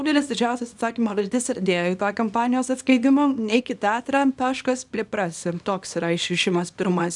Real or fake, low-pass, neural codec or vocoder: fake; 10.8 kHz; codec, 24 kHz, 0.9 kbps, WavTokenizer, small release